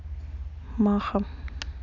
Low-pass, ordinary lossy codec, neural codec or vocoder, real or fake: 7.2 kHz; none; none; real